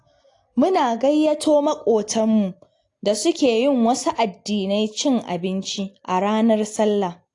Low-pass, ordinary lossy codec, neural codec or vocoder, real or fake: 10.8 kHz; AAC, 48 kbps; none; real